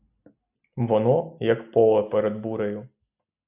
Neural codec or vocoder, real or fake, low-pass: none; real; 3.6 kHz